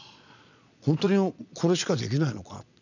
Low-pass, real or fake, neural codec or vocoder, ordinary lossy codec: 7.2 kHz; fake; vocoder, 22.05 kHz, 80 mel bands, Vocos; none